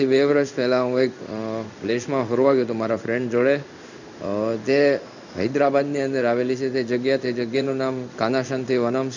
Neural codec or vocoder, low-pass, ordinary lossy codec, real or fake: codec, 16 kHz in and 24 kHz out, 1 kbps, XY-Tokenizer; 7.2 kHz; none; fake